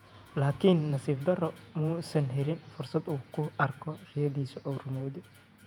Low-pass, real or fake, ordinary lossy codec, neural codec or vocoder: 19.8 kHz; fake; none; vocoder, 48 kHz, 128 mel bands, Vocos